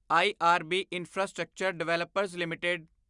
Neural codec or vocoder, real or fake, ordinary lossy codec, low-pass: none; real; Opus, 64 kbps; 10.8 kHz